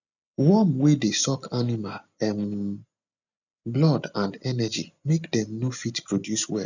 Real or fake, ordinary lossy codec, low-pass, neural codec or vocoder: real; none; 7.2 kHz; none